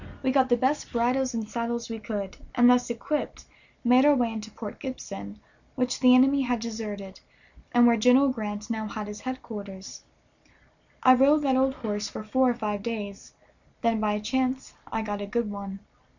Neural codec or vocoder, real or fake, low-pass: none; real; 7.2 kHz